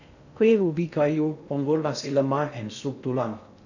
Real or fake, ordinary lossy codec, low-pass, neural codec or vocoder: fake; none; 7.2 kHz; codec, 16 kHz in and 24 kHz out, 0.6 kbps, FocalCodec, streaming, 2048 codes